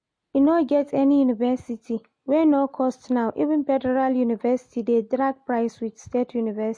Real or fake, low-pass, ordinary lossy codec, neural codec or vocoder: real; 9.9 kHz; MP3, 48 kbps; none